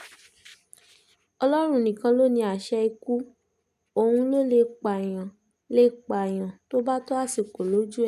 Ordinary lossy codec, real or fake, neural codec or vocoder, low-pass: none; real; none; 14.4 kHz